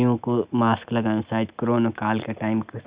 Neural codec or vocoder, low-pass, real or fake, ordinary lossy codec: none; 3.6 kHz; real; Opus, 64 kbps